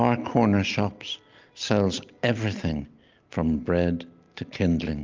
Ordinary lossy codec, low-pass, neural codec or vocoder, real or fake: Opus, 24 kbps; 7.2 kHz; none; real